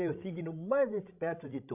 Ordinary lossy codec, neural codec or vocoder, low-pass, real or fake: none; codec, 16 kHz, 16 kbps, FreqCodec, larger model; 3.6 kHz; fake